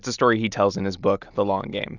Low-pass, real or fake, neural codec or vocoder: 7.2 kHz; real; none